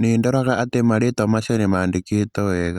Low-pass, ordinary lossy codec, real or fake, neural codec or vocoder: 19.8 kHz; Opus, 64 kbps; real; none